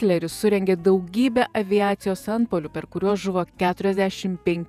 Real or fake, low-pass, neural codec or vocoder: fake; 14.4 kHz; vocoder, 48 kHz, 128 mel bands, Vocos